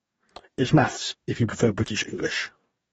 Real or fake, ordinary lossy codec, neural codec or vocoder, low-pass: fake; AAC, 24 kbps; codec, 44.1 kHz, 2.6 kbps, DAC; 19.8 kHz